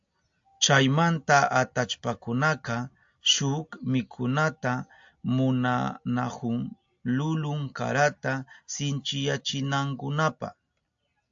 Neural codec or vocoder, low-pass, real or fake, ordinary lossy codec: none; 7.2 kHz; real; AAC, 64 kbps